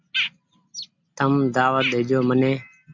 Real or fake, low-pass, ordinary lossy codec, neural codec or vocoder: real; 7.2 kHz; MP3, 64 kbps; none